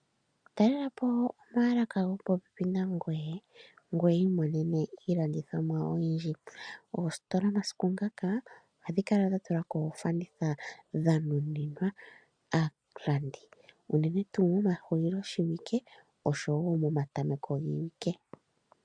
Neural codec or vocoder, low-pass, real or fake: none; 9.9 kHz; real